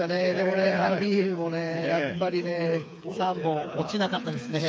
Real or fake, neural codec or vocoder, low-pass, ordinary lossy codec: fake; codec, 16 kHz, 4 kbps, FreqCodec, smaller model; none; none